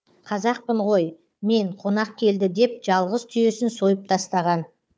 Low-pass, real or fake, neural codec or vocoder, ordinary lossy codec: none; fake; codec, 16 kHz, 4 kbps, FunCodec, trained on Chinese and English, 50 frames a second; none